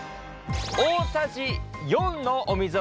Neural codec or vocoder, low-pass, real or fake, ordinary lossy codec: none; none; real; none